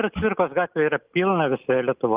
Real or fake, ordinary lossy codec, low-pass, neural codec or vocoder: real; Opus, 24 kbps; 3.6 kHz; none